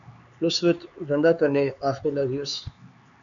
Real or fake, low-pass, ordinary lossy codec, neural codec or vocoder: fake; 7.2 kHz; MP3, 96 kbps; codec, 16 kHz, 4 kbps, X-Codec, HuBERT features, trained on LibriSpeech